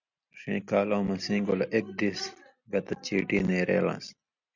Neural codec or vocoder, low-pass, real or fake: none; 7.2 kHz; real